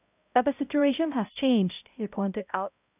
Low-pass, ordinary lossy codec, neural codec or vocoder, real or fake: 3.6 kHz; none; codec, 16 kHz, 0.5 kbps, X-Codec, HuBERT features, trained on balanced general audio; fake